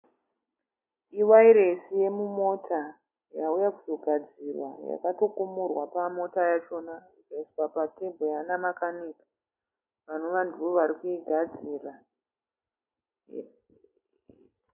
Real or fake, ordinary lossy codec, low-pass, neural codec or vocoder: real; MP3, 24 kbps; 3.6 kHz; none